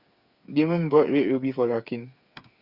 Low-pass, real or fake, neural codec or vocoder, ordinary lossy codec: 5.4 kHz; fake; codec, 16 kHz, 8 kbps, FreqCodec, smaller model; Opus, 64 kbps